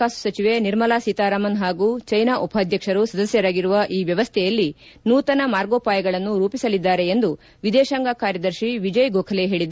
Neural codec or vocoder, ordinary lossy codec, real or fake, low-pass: none; none; real; none